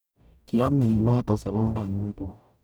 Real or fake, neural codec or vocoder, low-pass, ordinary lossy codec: fake; codec, 44.1 kHz, 0.9 kbps, DAC; none; none